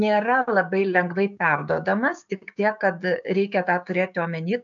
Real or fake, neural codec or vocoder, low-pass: fake; codec, 16 kHz, 6 kbps, DAC; 7.2 kHz